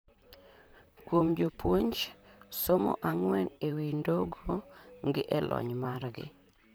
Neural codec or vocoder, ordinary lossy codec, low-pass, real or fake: vocoder, 44.1 kHz, 128 mel bands, Pupu-Vocoder; none; none; fake